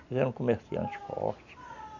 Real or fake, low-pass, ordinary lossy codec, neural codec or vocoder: real; 7.2 kHz; none; none